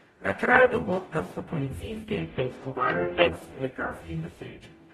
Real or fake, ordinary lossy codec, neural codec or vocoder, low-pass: fake; AAC, 32 kbps; codec, 44.1 kHz, 0.9 kbps, DAC; 19.8 kHz